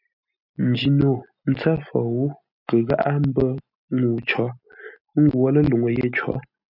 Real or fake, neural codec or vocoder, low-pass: real; none; 5.4 kHz